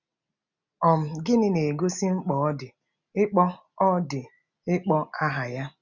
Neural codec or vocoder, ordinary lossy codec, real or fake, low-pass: none; none; real; 7.2 kHz